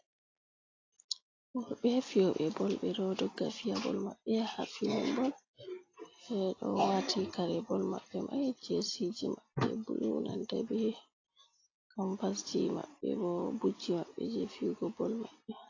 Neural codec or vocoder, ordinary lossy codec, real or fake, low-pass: none; AAC, 32 kbps; real; 7.2 kHz